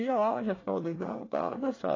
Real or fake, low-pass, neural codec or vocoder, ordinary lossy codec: fake; 7.2 kHz; codec, 24 kHz, 1 kbps, SNAC; AAC, 32 kbps